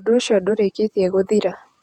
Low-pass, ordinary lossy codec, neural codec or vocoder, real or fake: 19.8 kHz; Opus, 64 kbps; vocoder, 48 kHz, 128 mel bands, Vocos; fake